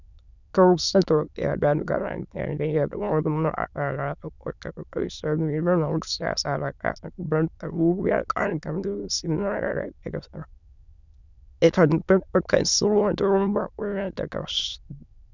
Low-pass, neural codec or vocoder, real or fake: 7.2 kHz; autoencoder, 22.05 kHz, a latent of 192 numbers a frame, VITS, trained on many speakers; fake